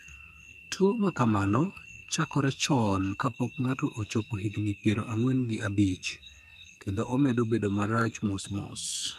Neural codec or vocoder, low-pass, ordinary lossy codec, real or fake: codec, 44.1 kHz, 2.6 kbps, SNAC; 14.4 kHz; none; fake